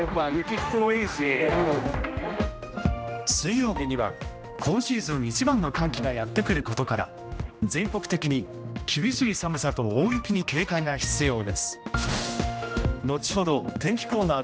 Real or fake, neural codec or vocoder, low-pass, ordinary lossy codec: fake; codec, 16 kHz, 1 kbps, X-Codec, HuBERT features, trained on general audio; none; none